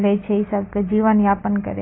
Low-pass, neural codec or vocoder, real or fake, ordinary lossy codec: 7.2 kHz; none; real; AAC, 16 kbps